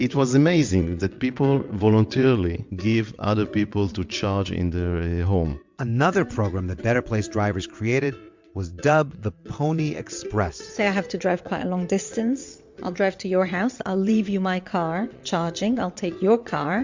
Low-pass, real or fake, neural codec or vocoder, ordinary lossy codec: 7.2 kHz; fake; vocoder, 22.05 kHz, 80 mel bands, WaveNeXt; MP3, 64 kbps